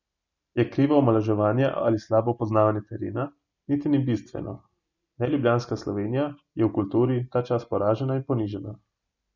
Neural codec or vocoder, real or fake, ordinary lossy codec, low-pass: none; real; none; 7.2 kHz